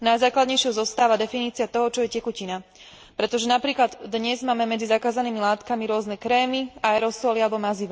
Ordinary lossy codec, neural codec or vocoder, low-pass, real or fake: none; none; none; real